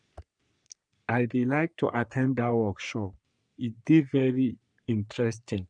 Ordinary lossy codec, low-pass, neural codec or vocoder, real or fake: none; 9.9 kHz; codec, 44.1 kHz, 3.4 kbps, Pupu-Codec; fake